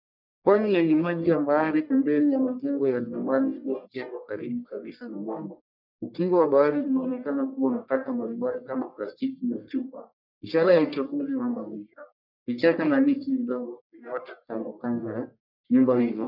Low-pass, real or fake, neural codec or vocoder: 5.4 kHz; fake; codec, 44.1 kHz, 1.7 kbps, Pupu-Codec